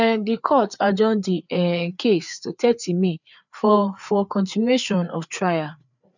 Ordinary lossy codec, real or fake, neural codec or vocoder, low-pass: none; fake; codec, 16 kHz, 4 kbps, FreqCodec, larger model; 7.2 kHz